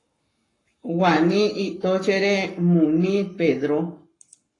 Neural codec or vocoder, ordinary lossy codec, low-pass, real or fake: codec, 44.1 kHz, 7.8 kbps, Pupu-Codec; AAC, 32 kbps; 10.8 kHz; fake